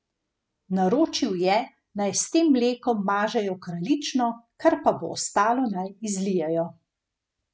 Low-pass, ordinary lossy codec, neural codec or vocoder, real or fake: none; none; none; real